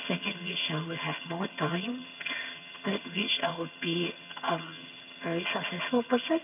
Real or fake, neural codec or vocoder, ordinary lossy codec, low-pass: fake; vocoder, 22.05 kHz, 80 mel bands, HiFi-GAN; none; 3.6 kHz